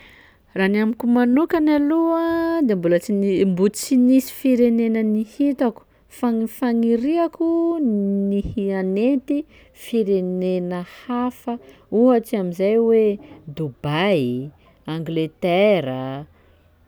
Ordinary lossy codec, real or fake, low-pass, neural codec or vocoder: none; real; none; none